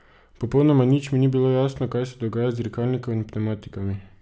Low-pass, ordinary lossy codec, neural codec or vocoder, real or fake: none; none; none; real